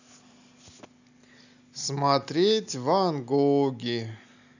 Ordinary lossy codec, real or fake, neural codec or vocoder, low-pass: none; real; none; 7.2 kHz